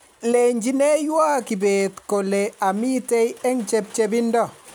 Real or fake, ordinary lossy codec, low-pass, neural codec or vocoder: real; none; none; none